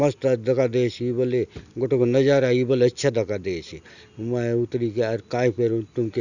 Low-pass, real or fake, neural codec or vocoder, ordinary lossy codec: 7.2 kHz; real; none; none